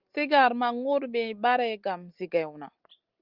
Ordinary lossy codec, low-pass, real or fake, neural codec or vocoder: Opus, 24 kbps; 5.4 kHz; real; none